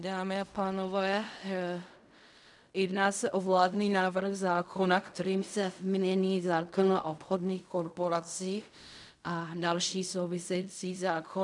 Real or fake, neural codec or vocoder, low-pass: fake; codec, 16 kHz in and 24 kHz out, 0.4 kbps, LongCat-Audio-Codec, fine tuned four codebook decoder; 10.8 kHz